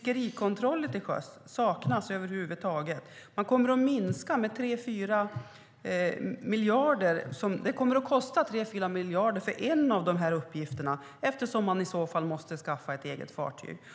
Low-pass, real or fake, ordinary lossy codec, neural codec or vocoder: none; real; none; none